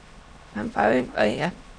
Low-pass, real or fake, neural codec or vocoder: 9.9 kHz; fake; autoencoder, 22.05 kHz, a latent of 192 numbers a frame, VITS, trained on many speakers